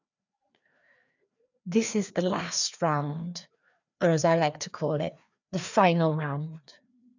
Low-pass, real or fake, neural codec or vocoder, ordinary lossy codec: 7.2 kHz; fake; codec, 16 kHz, 2 kbps, FreqCodec, larger model; none